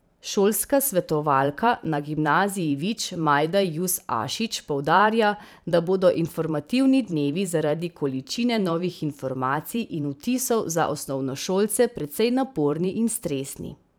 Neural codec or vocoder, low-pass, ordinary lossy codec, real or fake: vocoder, 44.1 kHz, 128 mel bands every 512 samples, BigVGAN v2; none; none; fake